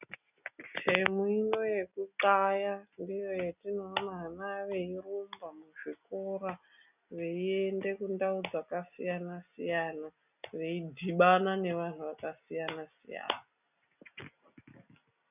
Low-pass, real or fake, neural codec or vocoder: 3.6 kHz; real; none